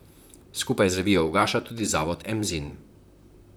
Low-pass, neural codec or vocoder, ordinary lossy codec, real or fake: none; vocoder, 44.1 kHz, 128 mel bands, Pupu-Vocoder; none; fake